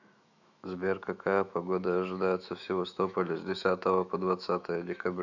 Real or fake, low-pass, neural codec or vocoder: fake; 7.2 kHz; autoencoder, 48 kHz, 128 numbers a frame, DAC-VAE, trained on Japanese speech